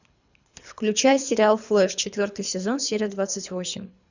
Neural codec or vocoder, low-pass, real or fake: codec, 24 kHz, 3 kbps, HILCodec; 7.2 kHz; fake